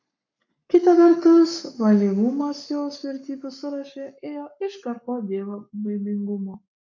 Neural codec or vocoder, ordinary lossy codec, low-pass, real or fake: codec, 44.1 kHz, 7.8 kbps, Pupu-Codec; MP3, 64 kbps; 7.2 kHz; fake